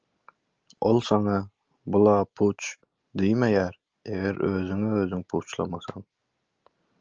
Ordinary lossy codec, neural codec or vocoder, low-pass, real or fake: Opus, 24 kbps; none; 7.2 kHz; real